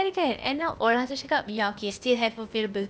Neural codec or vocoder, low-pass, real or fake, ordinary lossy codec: codec, 16 kHz, 0.8 kbps, ZipCodec; none; fake; none